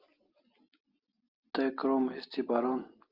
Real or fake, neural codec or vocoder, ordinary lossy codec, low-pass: real; none; Opus, 32 kbps; 5.4 kHz